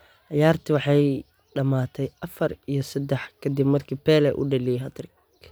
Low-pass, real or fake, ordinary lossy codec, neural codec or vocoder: none; real; none; none